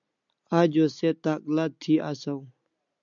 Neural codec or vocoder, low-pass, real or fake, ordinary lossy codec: none; 7.2 kHz; real; MP3, 96 kbps